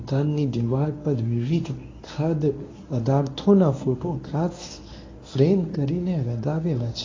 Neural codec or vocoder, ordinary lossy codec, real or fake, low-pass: codec, 24 kHz, 0.9 kbps, WavTokenizer, medium speech release version 1; AAC, 32 kbps; fake; 7.2 kHz